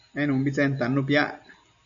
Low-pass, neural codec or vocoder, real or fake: 7.2 kHz; none; real